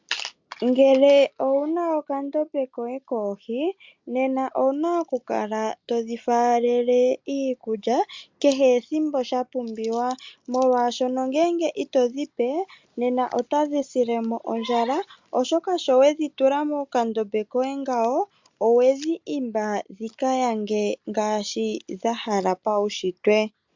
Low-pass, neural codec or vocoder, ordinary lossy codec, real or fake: 7.2 kHz; none; MP3, 64 kbps; real